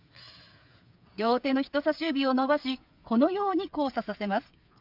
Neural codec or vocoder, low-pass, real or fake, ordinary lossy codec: codec, 16 kHz, 8 kbps, FreqCodec, smaller model; 5.4 kHz; fake; MP3, 48 kbps